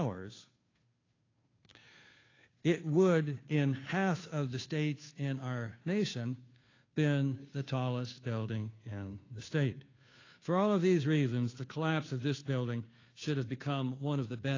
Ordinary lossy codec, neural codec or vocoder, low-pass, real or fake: AAC, 32 kbps; codec, 16 kHz, 2 kbps, FunCodec, trained on Chinese and English, 25 frames a second; 7.2 kHz; fake